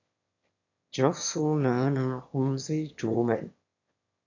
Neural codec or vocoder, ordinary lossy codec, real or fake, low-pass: autoencoder, 22.05 kHz, a latent of 192 numbers a frame, VITS, trained on one speaker; AAC, 48 kbps; fake; 7.2 kHz